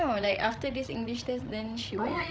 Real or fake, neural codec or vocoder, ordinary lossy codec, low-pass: fake; codec, 16 kHz, 16 kbps, FreqCodec, larger model; none; none